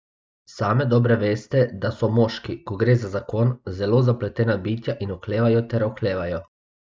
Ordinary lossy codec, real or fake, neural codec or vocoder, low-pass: none; real; none; none